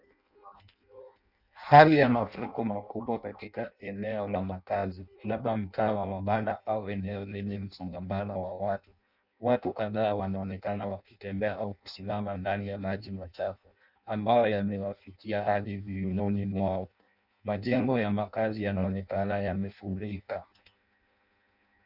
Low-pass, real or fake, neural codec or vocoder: 5.4 kHz; fake; codec, 16 kHz in and 24 kHz out, 0.6 kbps, FireRedTTS-2 codec